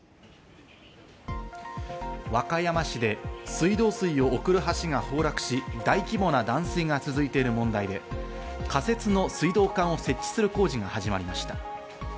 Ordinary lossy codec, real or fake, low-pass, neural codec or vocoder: none; real; none; none